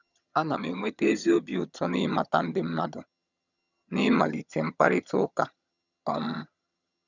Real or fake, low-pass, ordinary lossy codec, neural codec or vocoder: fake; 7.2 kHz; none; vocoder, 22.05 kHz, 80 mel bands, HiFi-GAN